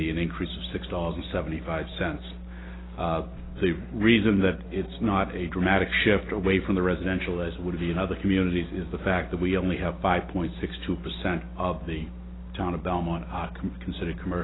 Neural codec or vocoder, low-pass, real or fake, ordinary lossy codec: none; 7.2 kHz; real; AAC, 16 kbps